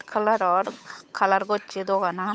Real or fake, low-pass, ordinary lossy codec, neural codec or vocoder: fake; none; none; codec, 16 kHz, 8 kbps, FunCodec, trained on Chinese and English, 25 frames a second